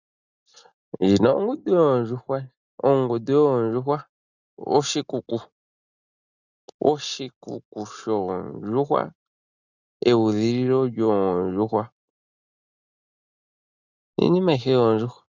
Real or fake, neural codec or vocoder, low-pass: real; none; 7.2 kHz